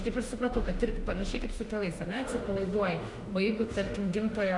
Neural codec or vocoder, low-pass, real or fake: autoencoder, 48 kHz, 32 numbers a frame, DAC-VAE, trained on Japanese speech; 10.8 kHz; fake